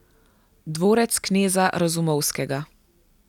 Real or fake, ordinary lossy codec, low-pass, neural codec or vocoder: real; none; 19.8 kHz; none